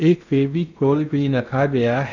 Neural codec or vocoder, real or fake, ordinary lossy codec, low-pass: codec, 16 kHz in and 24 kHz out, 0.6 kbps, FocalCodec, streaming, 2048 codes; fake; none; 7.2 kHz